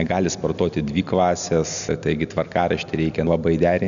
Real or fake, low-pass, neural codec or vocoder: real; 7.2 kHz; none